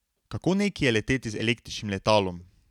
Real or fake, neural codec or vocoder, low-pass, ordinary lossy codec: real; none; 19.8 kHz; none